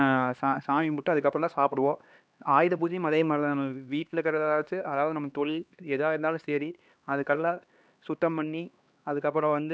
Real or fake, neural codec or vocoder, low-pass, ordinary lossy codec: fake; codec, 16 kHz, 2 kbps, X-Codec, HuBERT features, trained on LibriSpeech; none; none